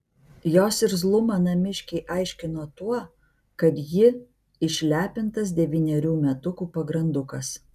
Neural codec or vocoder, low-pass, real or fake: none; 14.4 kHz; real